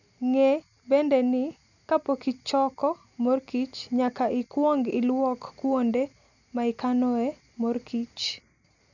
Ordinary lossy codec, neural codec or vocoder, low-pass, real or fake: none; none; 7.2 kHz; real